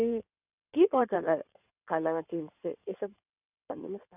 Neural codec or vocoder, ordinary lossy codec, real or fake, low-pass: codec, 16 kHz in and 24 kHz out, 2.2 kbps, FireRedTTS-2 codec; none; fake; 3.6 kHz